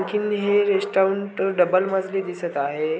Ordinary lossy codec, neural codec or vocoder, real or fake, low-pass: none; none; real; none